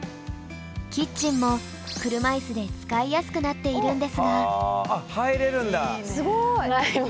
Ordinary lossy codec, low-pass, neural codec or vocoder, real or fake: none; none; none; real